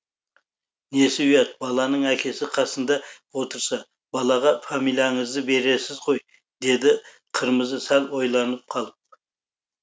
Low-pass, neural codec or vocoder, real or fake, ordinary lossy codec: none; none; real; none